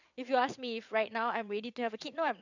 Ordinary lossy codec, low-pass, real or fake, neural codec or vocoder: none; 7.2 kHz; fake; vocoder, 22.05 kHz, 80 mel bands, WaveNeXt